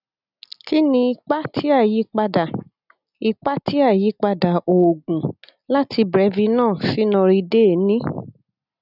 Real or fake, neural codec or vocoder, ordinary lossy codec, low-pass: real; none; none; 5.4 kHz